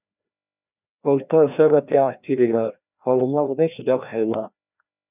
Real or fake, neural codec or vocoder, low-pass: fake; codec, 16 kHz, 1 kbps, FreqCodec, larger model; 3.6 kHz